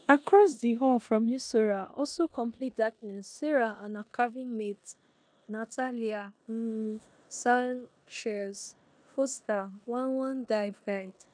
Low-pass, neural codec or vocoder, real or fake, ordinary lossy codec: 9.9 kHz; codec, 16 kHz in and 24 kHz out, 0.9 kbps, LongCat-Audio-Codec, four codebook decoder; fake; none